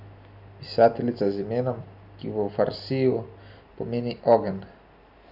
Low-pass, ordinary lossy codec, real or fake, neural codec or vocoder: 5.4 kHz; none; real; none